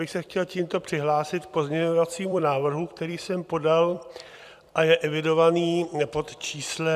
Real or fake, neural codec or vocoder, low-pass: fake; vocoder, 44.1 kHz, 128 mel bands every 256 samples, BigVGAN v2; 14.4 kHz